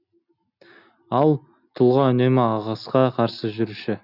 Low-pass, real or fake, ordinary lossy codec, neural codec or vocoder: 5.4 kHz; real; none; none